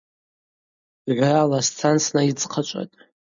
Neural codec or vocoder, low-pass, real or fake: none; 7.2 kHz; real